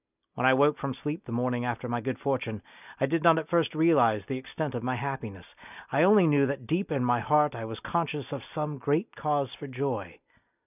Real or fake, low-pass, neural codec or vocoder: real; 3.6 kHz; none